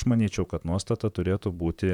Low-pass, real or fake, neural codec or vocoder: 19.8 kHz; fake; vocoder, 44.1 kHz, 128 mel bands, Pupu-Vocoder